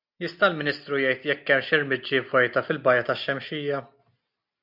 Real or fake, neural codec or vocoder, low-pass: real; none; 5.4 kHz